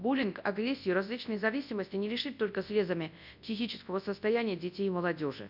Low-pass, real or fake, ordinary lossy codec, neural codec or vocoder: 5.4 kHz; fake; none; codec, 24 kHz, 0.9 kbps, WavTokenizer, large speech release